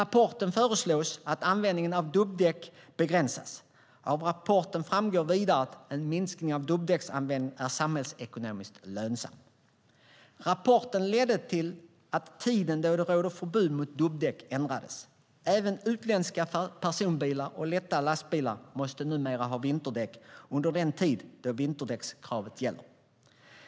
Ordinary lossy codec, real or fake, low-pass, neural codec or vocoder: none; real; none; none